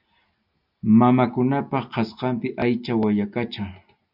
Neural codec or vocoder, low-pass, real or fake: none; 5.4 kHz; real